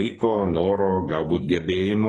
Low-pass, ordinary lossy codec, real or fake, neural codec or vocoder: 10.8 kHz; AAC, 32 kbps; fake; codec, 44.1 kHz, 2.6 kbps, SNAC